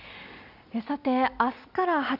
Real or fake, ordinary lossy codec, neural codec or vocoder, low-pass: real; none; none; 5.4 kHz